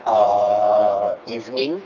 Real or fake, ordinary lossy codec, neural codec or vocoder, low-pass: fake; none; codec, 16 kHz, 2 kbps, FreqCodec, smaller model; 7.2 kHz